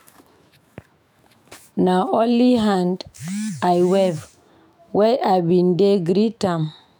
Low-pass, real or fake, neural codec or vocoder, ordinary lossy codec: none; fake; autoencoder, 48 kHz, 128 numbers a frame, DAC-VAE, trained on Japanese speech; none